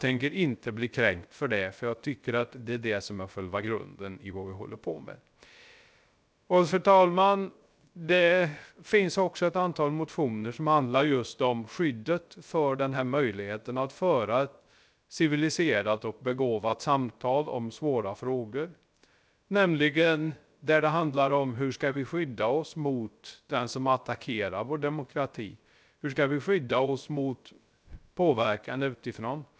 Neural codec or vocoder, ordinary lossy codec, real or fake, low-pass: codec, 16 kHz, 0.3 kbps, FocalCodec; none; fake; none